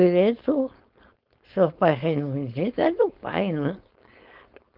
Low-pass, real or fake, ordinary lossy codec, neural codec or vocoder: 5.4 kHz; fake; Opus, 32 kbps; codec, 16 kHz, 4.8 kbps, FACodec